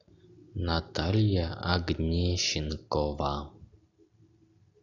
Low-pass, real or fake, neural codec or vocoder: 7.2 kHz; real; none